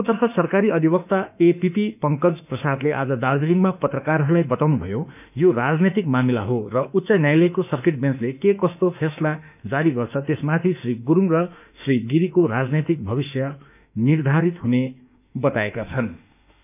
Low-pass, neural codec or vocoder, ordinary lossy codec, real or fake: 3.6 kHz; autoencoder, 48 kHz, 32 numbers a frame, DAC-VAE, trained on Japanese speech; none; fake